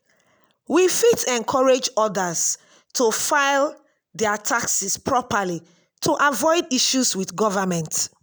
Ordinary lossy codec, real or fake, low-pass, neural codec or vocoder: none; real; none; none